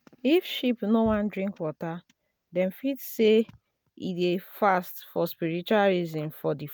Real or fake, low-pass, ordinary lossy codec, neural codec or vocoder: real; none; none; none